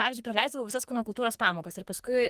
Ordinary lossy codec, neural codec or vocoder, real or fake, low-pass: Opus, 24 kbps; codec, 44.1 kHz, 2.6 kbps, SNAC; fake; 14.4 kHz